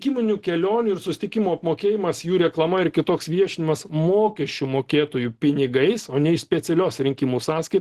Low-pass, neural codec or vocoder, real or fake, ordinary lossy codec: 14.4 kHz; none; real; Opus, 16 kbps